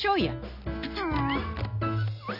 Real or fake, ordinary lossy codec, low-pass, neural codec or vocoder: real; none; 5.4 kHz; none